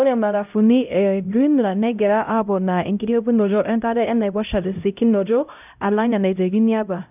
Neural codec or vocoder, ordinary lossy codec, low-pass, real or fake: codec, 16 kHz, 0.5 kbps, X-Codec, HuBERT features, trained on LibriSpeech; none; 3.6 kHz; fake